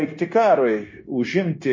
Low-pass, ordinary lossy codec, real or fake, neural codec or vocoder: 7.2 kHz; MP3, 32 kbps; fake; codec, 16 kHz, 0.9 kbps, LongCat-Audio-Codec